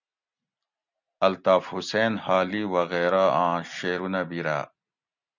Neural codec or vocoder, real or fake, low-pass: none; real; 7.2 kHz